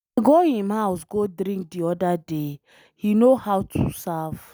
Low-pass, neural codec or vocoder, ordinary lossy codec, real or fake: none; none; none; real